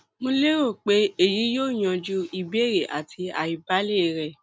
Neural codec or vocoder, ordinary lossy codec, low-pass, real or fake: none; none; none; real